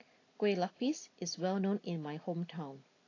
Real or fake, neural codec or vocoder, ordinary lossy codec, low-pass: real; none; AAC, 32 kbps; 7.2 kHz